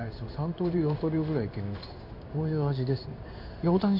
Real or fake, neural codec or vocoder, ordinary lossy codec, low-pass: fake; codec, 16 kHz in and 24 kHz out, 1 kbps, XY-Tokenizer; none; 5.4 kHz